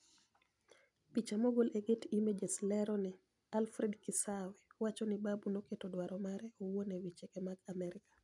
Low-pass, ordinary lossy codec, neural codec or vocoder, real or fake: 10.8 kHz; none; none; real